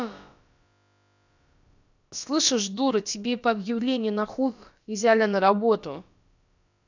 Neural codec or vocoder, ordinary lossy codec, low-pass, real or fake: codec, 16 kHz, about 1 kbps, DyCAST, with the encoder's durations; none; 7.2 kHz; fake